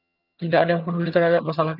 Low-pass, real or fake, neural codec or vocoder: 5.4 kHz; fake; vocoder, 22.05 kHz, 80 mel bands, HiFi-GAN